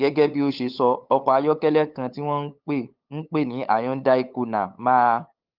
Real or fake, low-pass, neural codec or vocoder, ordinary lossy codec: fake; 5.4 kHz; codec, 16 kHz, 8 kbps, FunCodec, trained on LibriTTS, 25 frames a second; Opus, 24 kbps